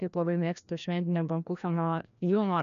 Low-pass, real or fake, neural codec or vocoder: 7.2 kHz; fake; codec, 16 kHz, 1 kbps, FreqCodec, larger model